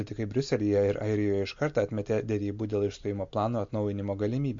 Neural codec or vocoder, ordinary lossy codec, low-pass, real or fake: none; MP3, 48 kbps; 7.2 kHz; real